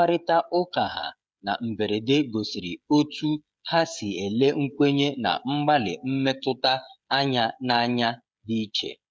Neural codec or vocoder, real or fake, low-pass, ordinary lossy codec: codec, 16 kHz, 16 kbps, FreqCodec, smaller model; fake; none; none